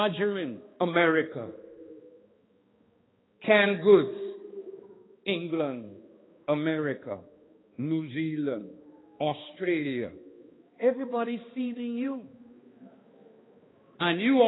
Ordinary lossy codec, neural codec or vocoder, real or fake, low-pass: AAC, 16 kbps; codec, 16 kHz, 2 kbps, X-Codec, HuBERT features, trained on balanced general audio; fake; 7.2 kHz